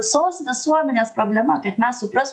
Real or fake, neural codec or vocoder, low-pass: fake; vocoder, 48 kHz, 128 mel bands, Vocos; 10.8 kHz